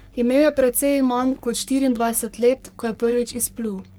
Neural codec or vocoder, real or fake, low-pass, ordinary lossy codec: codec, 44.1 kHz, 3.4 kbps, Pupu-Codec; fake; none; none